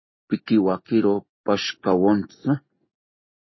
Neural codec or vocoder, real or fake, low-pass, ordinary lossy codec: none; real; 7.2 kHz; MP3, 24 kbps